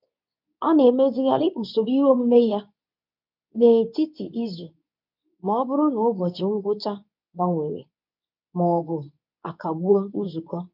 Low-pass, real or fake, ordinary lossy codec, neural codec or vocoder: 5.4 kHz; fake; none; codec, 24 kHz, 0.9 kbps, WavTokenizer, medium speech release version 2